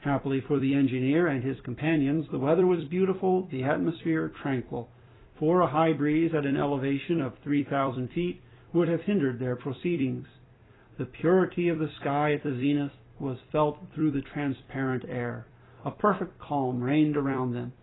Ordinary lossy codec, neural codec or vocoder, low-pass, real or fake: AAC, 16 kbps; vocoder, 44.1 kHz, 128 mel bands every 256 samples, BigVGAN v2; 7.2 kHz; fake